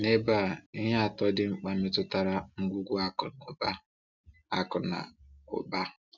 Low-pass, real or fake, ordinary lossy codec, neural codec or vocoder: 7.2 kHz; real; none; none